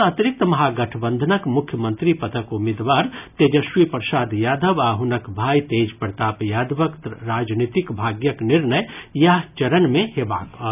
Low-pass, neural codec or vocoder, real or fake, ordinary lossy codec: 3.6 kHz; none; real; none